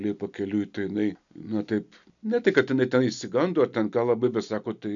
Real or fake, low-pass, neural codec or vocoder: real; 7.2 kHz; none